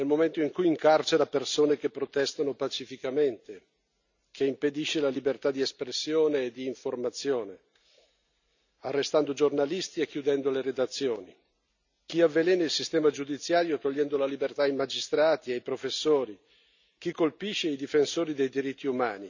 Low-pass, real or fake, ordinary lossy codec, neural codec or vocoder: 7.2 kHz; real; none; none